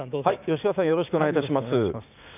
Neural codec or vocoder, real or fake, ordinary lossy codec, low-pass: codec, 16 kHz, 6 kbps, DAC; fake; none; 3.6 kHz